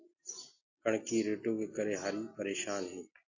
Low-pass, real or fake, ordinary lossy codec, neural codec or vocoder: 7.2 kHz; real; AAC, 48 kbps; none